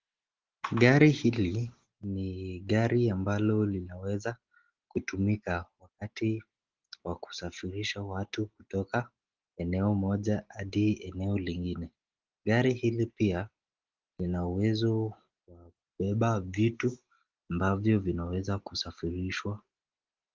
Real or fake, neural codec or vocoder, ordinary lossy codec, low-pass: real; none; Opus, 16 kbps; 7.2 kHz